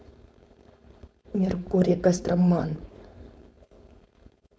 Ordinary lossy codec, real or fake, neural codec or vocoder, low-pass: none; fake; codec, 16 kHz, 4.8 kbps, FACodec; none